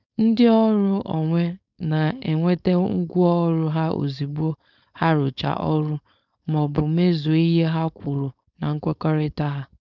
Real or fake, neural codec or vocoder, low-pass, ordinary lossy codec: fake; codec, 16 kHz, 4.8 kbps, FACodec; 7.2 kHz; none